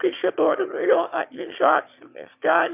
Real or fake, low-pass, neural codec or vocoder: fake; 3.6 kHz; autoencoder, 22.05 kHz, a latent of 192 numbers a frame, VITS, trained on one speaker